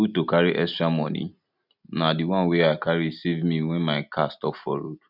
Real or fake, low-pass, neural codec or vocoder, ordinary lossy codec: real; 5.4 kHz; none; none